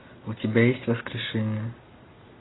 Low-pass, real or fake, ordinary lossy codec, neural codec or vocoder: 7.2 kHz; real; AAC, 16 kbps; none